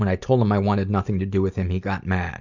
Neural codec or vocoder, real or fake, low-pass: none; real; 7.2 kHz